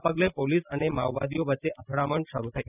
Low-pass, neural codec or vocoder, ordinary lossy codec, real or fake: 3.6 kHz; none; none; real